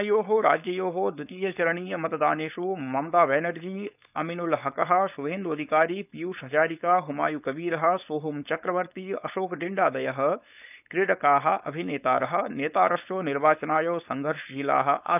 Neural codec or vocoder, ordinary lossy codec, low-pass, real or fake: codec, 16 kHz, 4.8 kbps, FACodec; none; 3.6 kHz; fake